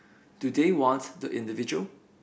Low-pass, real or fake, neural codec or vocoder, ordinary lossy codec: none; real; none; none